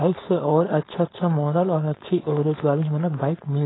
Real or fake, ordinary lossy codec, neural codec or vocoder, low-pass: fake; AAC, 16 kbps; codec, 16 kHz, 4.8 kbps, FACodec; 7.2 kHz